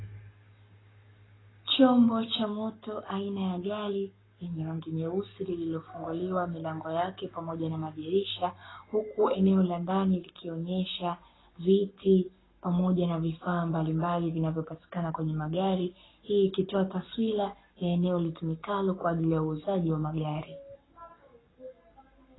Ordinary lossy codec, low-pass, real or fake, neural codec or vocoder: AAC, 16 kbps; 7.2 kHz; fake; codec, 44.1 kHz, 7.8 kbps, Pupu-Codec